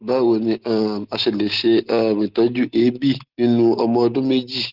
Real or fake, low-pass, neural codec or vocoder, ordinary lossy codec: real; 5.4 kHz; none; Opus, 16 kbps